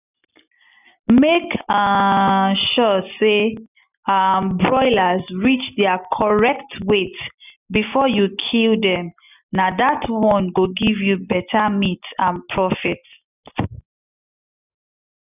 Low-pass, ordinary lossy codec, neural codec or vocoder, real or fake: 3.6 kHz; none; none; real